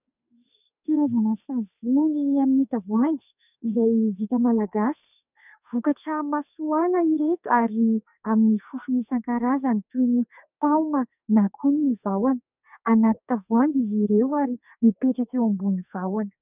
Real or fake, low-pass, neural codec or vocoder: fake; 3.6 kHz; codec, 44.1 kHz, 2.6 kbps, SNAC